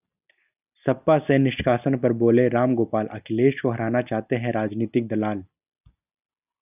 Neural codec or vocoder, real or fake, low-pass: none; real; 3.6 kHz